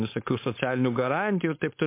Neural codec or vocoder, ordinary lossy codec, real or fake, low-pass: codec, 16 kHz, 4.8 kbps, FACodec; MP3, 24 kbps; fake; 3.6 kHz